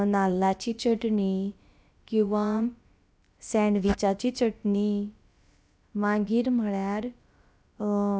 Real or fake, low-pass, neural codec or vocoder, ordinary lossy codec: fake; none; codec, 16 kHz, about 1 kbps, DyCAST, with the encoder's durations; none